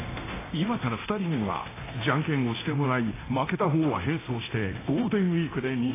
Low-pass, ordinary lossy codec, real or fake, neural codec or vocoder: 3.6 kHz; AAC, 16 kbps; fake; codec, 24 kHz, 0.9 kbps, DualCodec